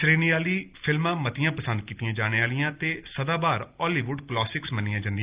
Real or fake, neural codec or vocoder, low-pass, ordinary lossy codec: real; none; 3.6 kHz; Opus, 32 kbps